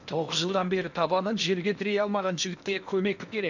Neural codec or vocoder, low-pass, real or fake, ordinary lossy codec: codec, 16 kHz in and 24 kHz out, 0.8 kbps, FocalCodec, streaming, 65536 codes; 7.2 kHz; fake; none